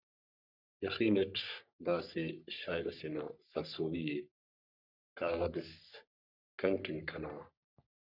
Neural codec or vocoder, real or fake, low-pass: codec, 44.1 kHz, 3.4 kbps, Pupu-Codec; fake; 5.4 kHz